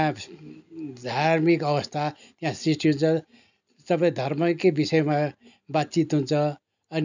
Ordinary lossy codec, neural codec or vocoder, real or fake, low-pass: none; none; real; 7.2 kHz